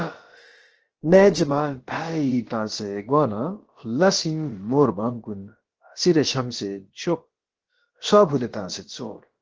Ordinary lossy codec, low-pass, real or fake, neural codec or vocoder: Opus, 16 kbps; 7.2 kHz; fake; codec, 16 kHz, about 1 kbps, DyCAST, with the encoder's durations